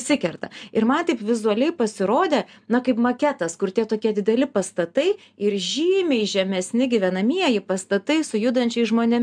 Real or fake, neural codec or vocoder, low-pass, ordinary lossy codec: real; none; 9.9 kHz; MP3, 96 kbps